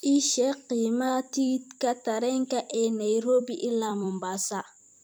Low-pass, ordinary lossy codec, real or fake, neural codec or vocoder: none; none; fake; vocoder, 44.1 kHz, 128 mel bands every 256 samples, BigVGAN v2